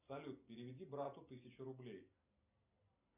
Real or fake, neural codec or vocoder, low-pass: real; none; 3.6 kHz